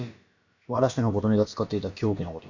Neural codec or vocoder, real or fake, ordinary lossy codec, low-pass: codec, 16 kHz, about 1 kbps, DyCAST, with the encoder's durations; fake; none; 7.2 kHz